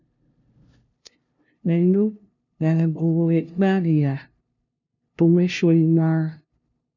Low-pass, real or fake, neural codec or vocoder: 7.2 kHz; fake; codec, 16 kHz, 0.5 kbps, FunCodec, trained on LibriTTS, 25 frames a second